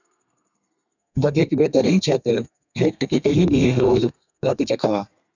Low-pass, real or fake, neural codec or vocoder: 7.2 kHz; fake; codec, 32 kHz, 1.9 kbps, SNAC